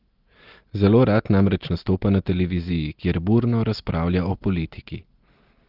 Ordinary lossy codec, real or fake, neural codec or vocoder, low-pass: Opus, 16 kbps; real; none; 5.4 kHz